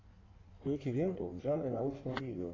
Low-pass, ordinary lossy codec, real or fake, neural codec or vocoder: 7.2 kHz; AAC, 32 kbps; fake; codec, 16 kHz in and 24 kHz out, 1.1 kbps, FireRedTTS-2 codec